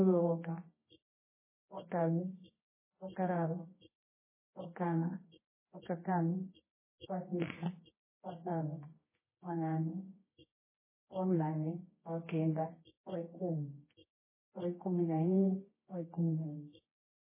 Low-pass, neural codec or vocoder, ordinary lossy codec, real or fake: 3.6 kHz; codec, 24 kHz, 0.9 kbps, WavTokenizer, medium music audio release; MP3, 16 kbps; fake